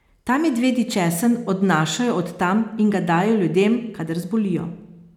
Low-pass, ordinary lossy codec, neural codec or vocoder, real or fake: 19.8 kHz; none; none; real